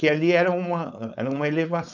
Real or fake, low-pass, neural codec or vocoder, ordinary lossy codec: fake; 7.2 kHz; codec, 16 kHz, 4.8 kbps, FACodec; none